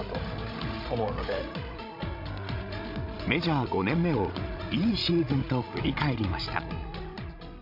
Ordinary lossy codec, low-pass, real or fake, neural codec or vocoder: MP3, 48 kbps; 5.4 kHz; fake; codec, 16 kHz, 16 kbps, FreqCodec, larger model